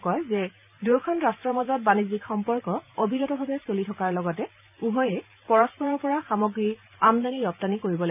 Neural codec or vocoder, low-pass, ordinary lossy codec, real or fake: vocoder, 44.1 kHz, 128 mel bands every 512 samples, BigVGAN v2; 3.6 kHz; none; fake